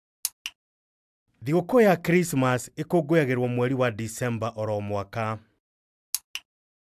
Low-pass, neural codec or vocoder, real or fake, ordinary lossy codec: 14.4 kHz; none; real; AAC, 96 kbps